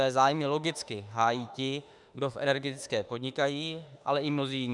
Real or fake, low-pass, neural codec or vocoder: fake; 10.8 kHz; autoencoder, 48 kHz, 32 numbers a frame, DAC-VAE, trained on Japanese speech